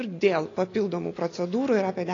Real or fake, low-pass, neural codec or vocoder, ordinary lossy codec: real; 7.2 kHz; none; AAC, 32 kbps